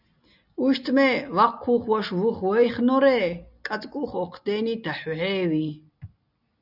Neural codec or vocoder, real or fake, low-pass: none; real; 5.4 kHz